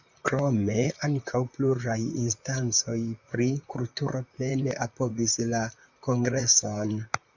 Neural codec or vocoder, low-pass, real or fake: vocoder, 44.1 kHz, 128 mel bands, Pupu-Vocoder; 7.2 kHz; fake